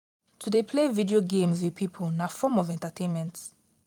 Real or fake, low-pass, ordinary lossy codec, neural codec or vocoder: fake; 19.8 kHz; none; vocoder, 44.1 kHz, 128 mel bands every 256 samples, BigVGAN v2